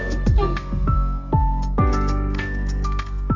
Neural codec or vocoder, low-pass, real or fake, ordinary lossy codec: codec, 32 kHz, 1.9 kbps, SNAC; 7.2 kHz; fake; MP3, 64 kbps